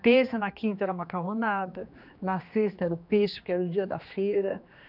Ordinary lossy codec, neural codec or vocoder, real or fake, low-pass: none; codec, 16 kHz, 2 kbps, X-Codec, HuBERT features, trained on general audio; fake; 5.4 kHz